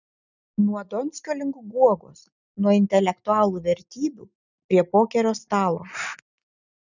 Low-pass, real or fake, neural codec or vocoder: 7.2 kHz; real; none